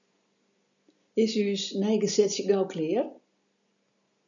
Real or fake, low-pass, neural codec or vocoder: real; 7.2 kHz; none